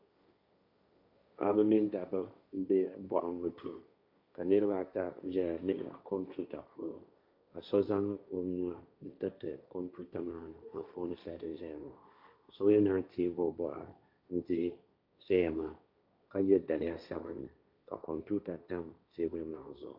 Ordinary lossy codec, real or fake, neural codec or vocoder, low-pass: MP3, 48 kbps; fake; codec, 16 kHz, 1.1 kbps, Voila-Tokenizer; 5.4 kHz